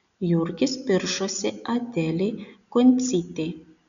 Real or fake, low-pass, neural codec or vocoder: real; 7.2 kHz; none